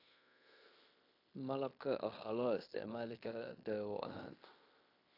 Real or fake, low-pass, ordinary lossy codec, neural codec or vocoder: fake; 5.4 kHz; AAC, 32 kbps; codec, 16 kHz in and 24 kHz out, 0.9 kbps, LongCat-Audio-Codec, fine tuned four codebook decoder